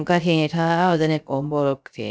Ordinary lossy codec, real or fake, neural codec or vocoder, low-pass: none; fake; codec, 16 kHz, 0.3 kbps, FocalCodec; none